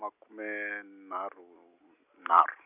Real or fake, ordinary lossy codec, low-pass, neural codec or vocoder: real; none; 3.6 kHz; none